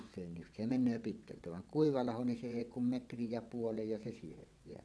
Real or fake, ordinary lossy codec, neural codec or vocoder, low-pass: fake; none; vocoder, 22.05 kHz, 80 mel bands, WaveNeXt; none